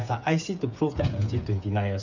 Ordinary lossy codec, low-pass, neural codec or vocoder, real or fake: none; 7.2 kHz; codec, 16 kHz, 8 kbps, FreqCodec, smaller model; fake